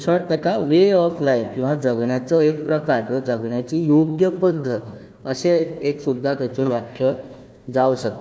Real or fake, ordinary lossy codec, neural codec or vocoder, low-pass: fake; none; codec, 16 kHz, 1 kbps, FunCodec, trained on Chinese and English, 50 frames a second; none